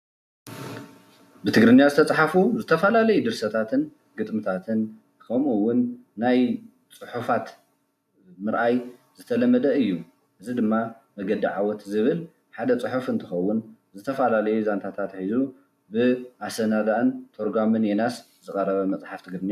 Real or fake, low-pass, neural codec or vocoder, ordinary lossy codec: real; 14.4 kHz; none; AAC, 96 kbps